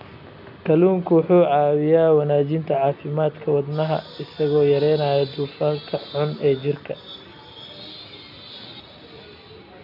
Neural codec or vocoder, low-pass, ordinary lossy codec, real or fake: none; 5.4 kHz; none; real